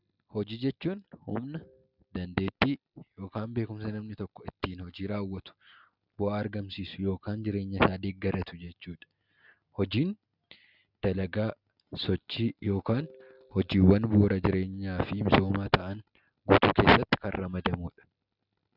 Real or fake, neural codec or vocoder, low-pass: real; none; 5.4 kHz